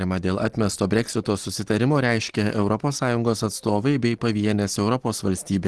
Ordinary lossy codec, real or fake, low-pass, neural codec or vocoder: Opus, 16 kbps; real; 10.8 kHz; none